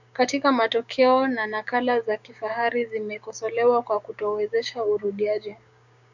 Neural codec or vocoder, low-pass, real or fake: codec, 16 kHz, 6 kbps, DAC; 7.2 kHz; fake